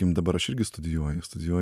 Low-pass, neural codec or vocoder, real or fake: 14.4 kHz; none; real